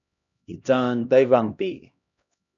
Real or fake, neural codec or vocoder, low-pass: fake; codec, 16 kHz, 1 kbps, X-Codec, HuBERT features, trained on LibriSpeech; 7.2 kHz